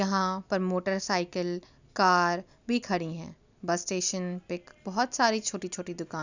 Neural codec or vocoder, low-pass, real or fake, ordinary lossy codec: none; 7.2 kHz; real; none